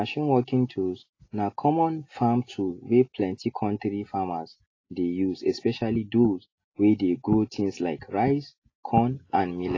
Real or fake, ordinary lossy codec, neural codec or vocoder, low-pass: real; AAC, 32 kbps; none; 7.2 kHz